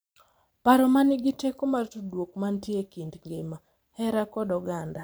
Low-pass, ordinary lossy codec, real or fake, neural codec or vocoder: none; none; fake; vocoder, 44.1 kHz, 128 mel bands every 512 samples, BigVGAN v2